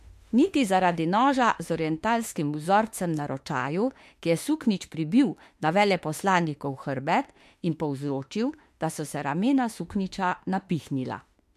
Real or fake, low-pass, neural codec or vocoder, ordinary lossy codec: fake; 14.4 kHz; autoencoder, 48 kHz, 32 numbers a frame, DAC-VAE, trained on Japanese speech; MP3, 64 kbps